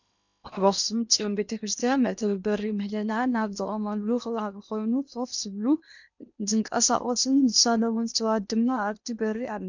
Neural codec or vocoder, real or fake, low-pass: codec, 16 kHz in and 24 kHz out, 0.8 kbps, FocalCodec, streaming, 65536 codes; fake; 7.2 kHz